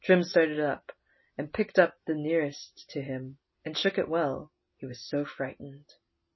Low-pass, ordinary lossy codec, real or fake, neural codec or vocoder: 7.2 kHz; MP3, 24 kbps; real; none